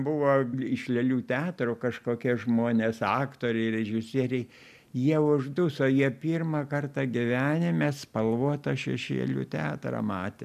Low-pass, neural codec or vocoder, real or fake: 14.4 kHz; none; real